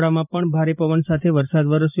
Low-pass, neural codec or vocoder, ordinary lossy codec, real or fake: 3.6 kHz; vocoder, 44.1 kHz, 128 mel bands every 256 samples, BigVGAN v2; none; fake